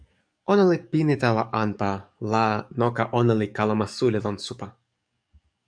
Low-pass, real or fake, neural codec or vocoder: 9.9 kHz; fake; codec, 44.1 kHz, 7.8 kbps, DAC